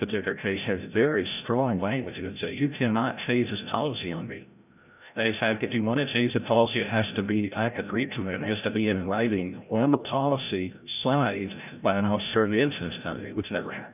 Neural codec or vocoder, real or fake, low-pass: codec, 16 kHz, 0.5 kbps, FreqCodec, larger model; fake; 3.6 kHz